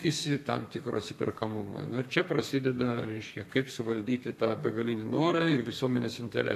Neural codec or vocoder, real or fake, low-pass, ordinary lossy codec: codec, 32 kHz, 1.9 kbps, SNAC; fake; 14.4 kHz; AAC, 64 kbps